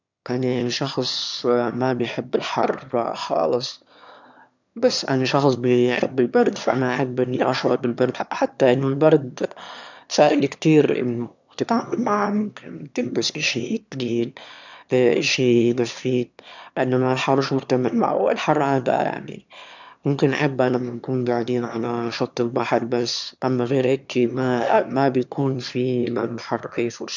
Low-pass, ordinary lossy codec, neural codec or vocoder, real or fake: 7.2 kHz; none; autoencoder, 22.05 kHz, a latent of 192 numbers a frame, VITS, trained on one speaker; fake